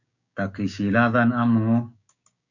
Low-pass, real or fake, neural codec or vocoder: 7.2 kHz; fake; codec, 16 kHz, 6 kbps, DAC